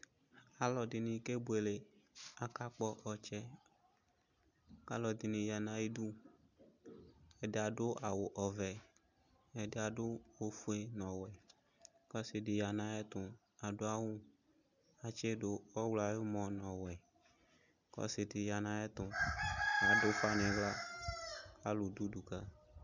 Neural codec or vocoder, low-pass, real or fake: none; 7.2 kHz; real